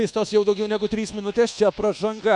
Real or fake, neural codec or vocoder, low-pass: fake; codec, 24 kHz, 1.2 kbps, DualCodec; 10.8 kHz